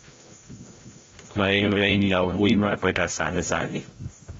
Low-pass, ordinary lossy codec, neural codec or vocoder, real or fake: 7.2 kHz; AAC, 24 kbps; codec, 16 kHz, 0.5 kbps, FreqCodec, larger model; fake